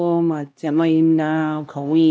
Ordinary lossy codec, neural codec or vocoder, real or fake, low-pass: none; codec, 16 kHz, 1 kbps, X-Codec, HuBERT features, trained on LibriSpeech; fake; none